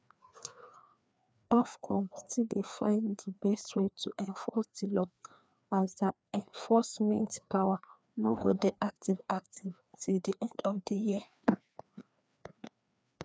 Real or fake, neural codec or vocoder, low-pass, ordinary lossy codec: fake; codec, 16 kHz, 2 kbps, FreqCodec, larger model; none; none